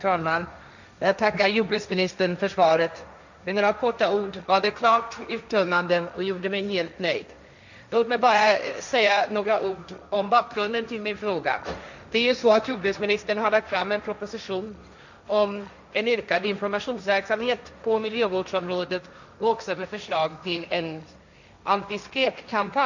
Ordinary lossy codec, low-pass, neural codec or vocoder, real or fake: none; 7.2 kHz; codec, 16 kHz, 1.1 kbps, Voila-Tokenizer; fake